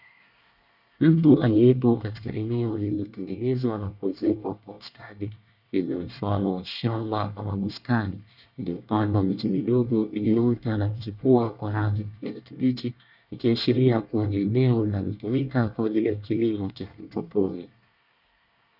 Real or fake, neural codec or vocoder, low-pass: fake; codec, 24 kHz, 1 kbps, SNAC; 5.4 kHz